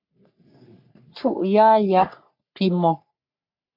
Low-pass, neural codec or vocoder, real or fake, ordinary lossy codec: 5.4 kHz; codec, 44.1 kHz, 3.4 kbps, Pupu-Codec; fake; MP3, 48 kbps